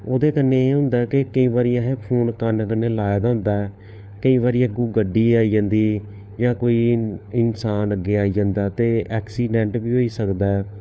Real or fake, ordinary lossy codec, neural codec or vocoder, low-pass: fake; none; codec, 16 kHz, 4 kbps, FunCodec, trained on LibriTTS, 50 frames a second; none